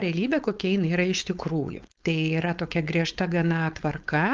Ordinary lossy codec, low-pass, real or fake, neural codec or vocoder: Opus, 24 kbps; 7.2 kHz; fake; codec, 16 kHz, 4.8 kbps, FACodec